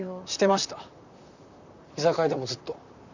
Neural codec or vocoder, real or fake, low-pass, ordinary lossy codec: vocoder, 44.1 kHz, 128 mel bands, Pupu-Vocoder; fake; 7.2 kHz; none